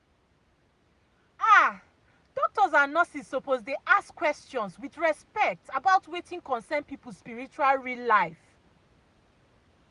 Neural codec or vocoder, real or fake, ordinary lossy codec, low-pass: none; real; Opus, 64 kbps; 10.8 kHz